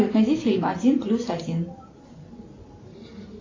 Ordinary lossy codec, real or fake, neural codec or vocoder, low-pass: AAC, 32 kbps; real; none; 7.2 kHz